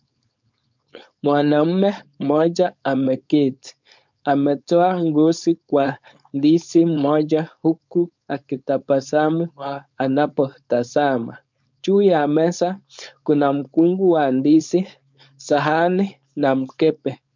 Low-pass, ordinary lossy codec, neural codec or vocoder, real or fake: 7.2 kHz; MP3, 64 kbps; codec, 16 kHz, 4.8 kbps, FACodec; fake